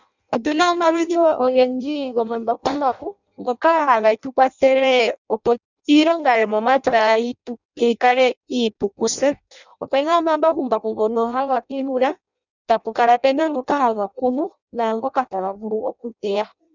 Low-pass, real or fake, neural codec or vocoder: 7.2 kHz; fake; codec, 16 kHz in and 24 kHz out, 0.6 kbps, FireRedTTS-2 codec